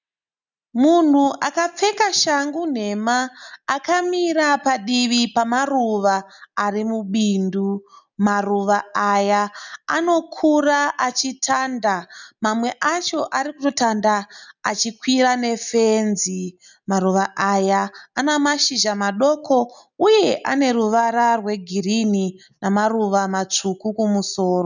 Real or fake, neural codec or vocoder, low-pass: real; none; 7.2 kHz